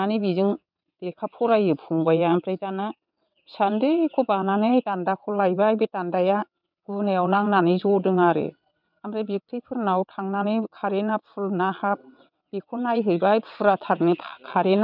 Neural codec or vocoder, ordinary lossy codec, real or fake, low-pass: vocoder, 22.05 kHz, 80 mel bands, WaveNeXt; none; fake; 5.4 kHz